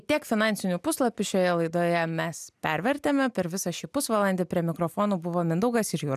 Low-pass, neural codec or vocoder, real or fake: 14.4 kHz; none; real